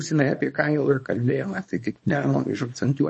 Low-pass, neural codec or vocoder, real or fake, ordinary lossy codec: 10.8 kHz; codec, 24 kHz, 0.9 kbps, WavTokenizer, small release; fake; MP3, 32 kbps